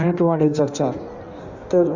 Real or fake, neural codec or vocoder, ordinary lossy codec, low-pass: fake; codec, 16 kHz in and 24 kHz out, 1.1 kbps, FireRedTTS-2 codec; Opus, 64 kbps; 7.2 kHz